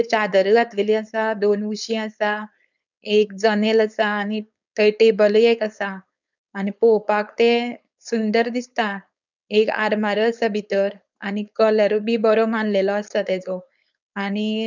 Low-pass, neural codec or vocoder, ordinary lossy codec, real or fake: 7.2 kHz; codec, 16 kHz, 4.8 kbps, FACodec; none; fake